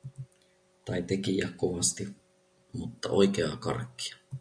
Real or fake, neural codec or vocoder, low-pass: real; none; 9.9 kHz